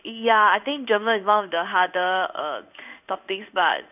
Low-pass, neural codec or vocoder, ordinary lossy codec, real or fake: 3.6 kHz; codec, 16 kHz in and 24 kHz out, 1 kbps, XY-Tokenizer; none; fake